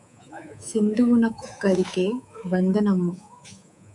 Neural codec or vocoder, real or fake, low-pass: codec, 24 kHz, 3.1 kbps, DualCodec; fake; 10.8 kHz